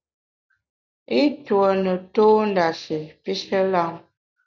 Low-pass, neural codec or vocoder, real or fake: 7.2 kHz; none; real